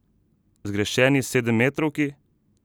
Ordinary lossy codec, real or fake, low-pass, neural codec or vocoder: none; real; none; none